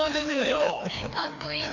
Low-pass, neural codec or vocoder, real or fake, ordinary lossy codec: 7.2 kHz; codec, 16 kHz, 2 kbps, FreqCodec, larger model; fake; none